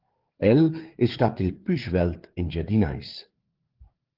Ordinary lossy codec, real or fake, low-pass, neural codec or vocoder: Opus, 16 kbps; fake; 5.4 kHz; codec, 16 kHz, 4 kbps, FreqCodec, larger model